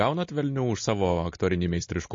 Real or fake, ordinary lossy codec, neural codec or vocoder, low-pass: real; MP3, 32 kbps; none; 7.2 kHz